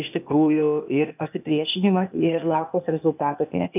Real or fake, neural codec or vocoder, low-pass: fake; codec, 16 kHz, 0.8 kbps, ZipCodec; 3.6 kHz